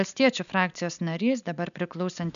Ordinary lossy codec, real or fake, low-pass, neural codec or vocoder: MP3, 96 kbps; real; 7.2 kHz; none